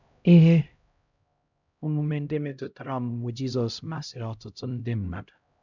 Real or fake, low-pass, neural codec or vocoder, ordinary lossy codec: fake; 7.2 kHz; codec, 16 kHz, 0.5 kbps, X-Codec, HuBERT features, trained on LibriSpeech; none